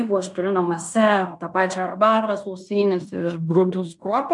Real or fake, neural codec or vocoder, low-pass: fake; codec, 16 kHz in and 24 kHz out, 0.9 kbps, LongCat-Audio-Codec, fine tuned four codebook decoder; 10.8 kHz